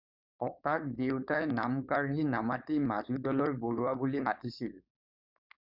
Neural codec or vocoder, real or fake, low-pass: codec, 16 kHz, 4.8 kbps, FACodec; fake; 5.4 kHz